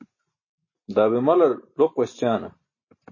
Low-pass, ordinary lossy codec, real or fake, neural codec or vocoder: 7.2 kHz; MP3, 32 kbps; real; none